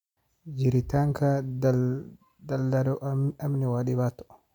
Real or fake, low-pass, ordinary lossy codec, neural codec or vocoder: real; 19.8 kHz; none; none